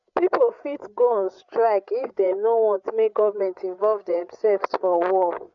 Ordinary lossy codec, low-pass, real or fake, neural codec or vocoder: none; 7.2 kHz; fake; codec, 16 kHz, 8 kbps, FreqCodec, larger model